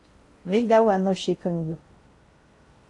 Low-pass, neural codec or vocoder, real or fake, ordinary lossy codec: 10.8 kHz; codec, 16 kHz in and 24 kHz out, 0.6 kbps, FocalCodec, streaming, 4096 codes; fake; AAC, 48 kbps